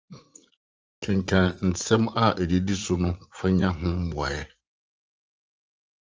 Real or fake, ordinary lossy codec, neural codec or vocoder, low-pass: fake; Opus, 24 kbps; vocoder, 44.1 kHz, 80 mel bands, Vocos; 7.2 kHz